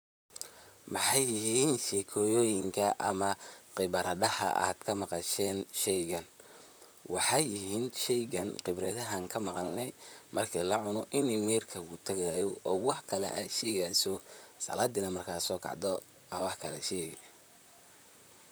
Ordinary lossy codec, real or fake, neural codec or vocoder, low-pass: none; fake; vocoder, 44.1 kHz, 128 mel bands, Pupu-Vocoder; none